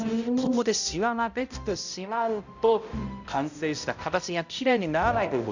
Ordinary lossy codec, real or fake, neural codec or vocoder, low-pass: none; fake; codec, 16 kHz, 0.5 kbps, X-Codec, HuBERT features, trained on balanced general audio; 7.2 kHz